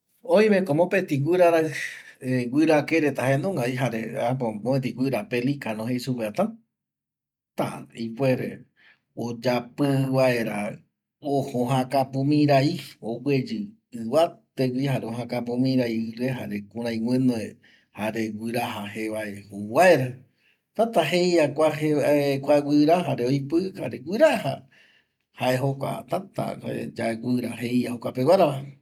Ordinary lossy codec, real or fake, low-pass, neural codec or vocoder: none; real; 19.8 kHz; none